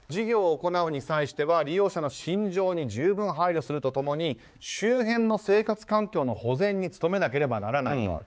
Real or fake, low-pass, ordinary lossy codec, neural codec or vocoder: fake; none; none; codec, 16 kHz, 4 kbps, X-Codec, HuBERT features, trained on balanced general audio